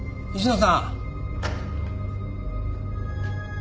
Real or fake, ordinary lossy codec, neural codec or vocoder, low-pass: real; none; none; none